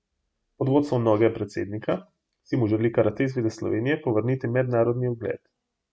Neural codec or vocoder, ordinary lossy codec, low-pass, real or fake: none; none; none; real